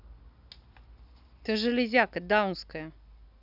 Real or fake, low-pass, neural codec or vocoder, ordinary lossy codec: real; 5.4 kHz; none; none